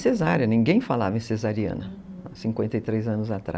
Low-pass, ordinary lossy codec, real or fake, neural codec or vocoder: none; none; real; none